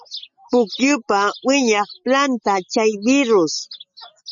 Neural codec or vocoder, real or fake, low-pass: none; real; 7.2 kHz